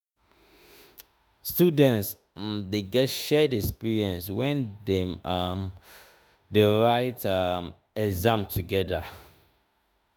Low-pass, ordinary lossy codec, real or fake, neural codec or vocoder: none; none; fake; autoencoder, 48 kHz, 32 numbers a frame, DAC-VAE, trained on Japanese speech